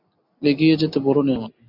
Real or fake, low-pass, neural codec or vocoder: real; 5.4 kHz; none